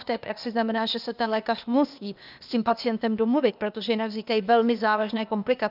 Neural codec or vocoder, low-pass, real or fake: codec, 16 kHz, 0.8 kbps, ZipCodec; 5.4 kHz; fake